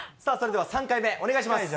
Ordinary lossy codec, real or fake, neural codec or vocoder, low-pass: none; real; none; none